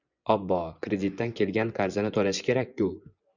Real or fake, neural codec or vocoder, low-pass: fake; vocoder, 24 kHz, 100 mel bands, Vocos; 7.2 kHz